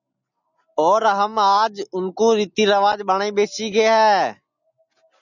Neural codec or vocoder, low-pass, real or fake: none; 7.2 kHz; real